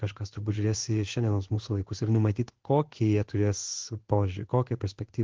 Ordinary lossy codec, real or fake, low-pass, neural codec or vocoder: Opus, 16 kbps; fake; 7.2 kHz; codec, 16 kHz in and 24 kHz out, 1 kbps, XY-Tokenizer